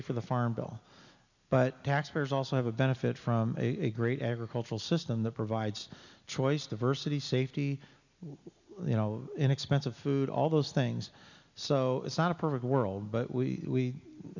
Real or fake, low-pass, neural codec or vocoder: real; 7.2 kHz; none